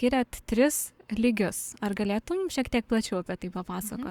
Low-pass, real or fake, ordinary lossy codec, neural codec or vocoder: 19.8 kHz; fake; Opus, 64 kbps; codec, 44.1 kHz, 7.8 kbps, Pupu-Codec